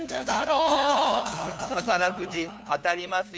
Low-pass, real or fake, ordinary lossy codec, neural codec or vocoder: none; fake; none; codec, 16 kHz, 2 kbps, FunCodec, trained on LibriTTS, 25 frames a second